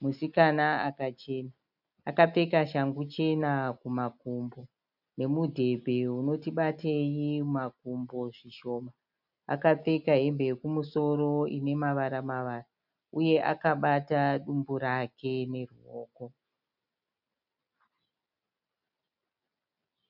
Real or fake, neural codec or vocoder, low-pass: real; none; 5.4 kHz